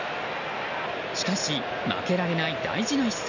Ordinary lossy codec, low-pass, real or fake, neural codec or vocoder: none; 7.2 kHz; real; none